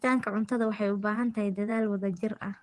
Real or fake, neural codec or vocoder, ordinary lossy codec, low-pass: fake; vocoder, 24 kHz, 100 mel bands, Vocos; Opus, 24 kbps; 10.8 kHz